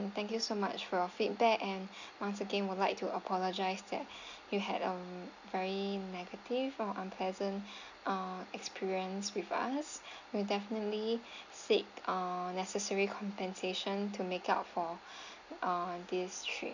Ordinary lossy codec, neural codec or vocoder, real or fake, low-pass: none; none; real; 7.2 kHz